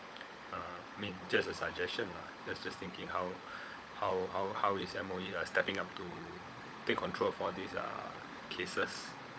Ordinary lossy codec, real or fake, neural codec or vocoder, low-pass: none; fake; codec, 16 kHz, 16 kbps, FunCodec, trained on LibriTTS, 50 frames a second; none